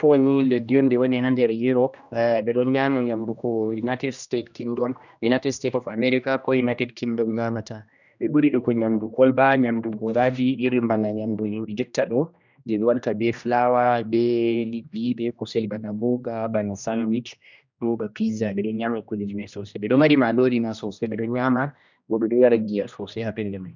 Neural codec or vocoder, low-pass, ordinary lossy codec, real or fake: codec, 16 kHz, 1 kbps, X-Codec, HuBERT features, trained on general audio; 7.2 kHz; none; fake